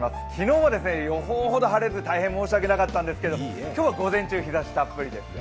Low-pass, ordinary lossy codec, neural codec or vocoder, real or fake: none; none; none; real